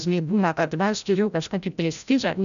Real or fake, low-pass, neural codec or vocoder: fake; 7.2 kHz; codec, 16 kHz, 0.5 kbps, FreqCodec, larger model